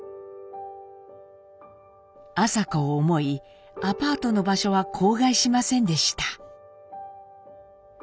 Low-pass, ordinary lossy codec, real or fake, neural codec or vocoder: none; none; real; none